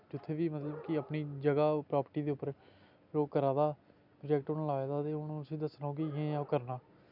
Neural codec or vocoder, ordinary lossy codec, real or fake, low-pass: none; none; real; 5.4 kHz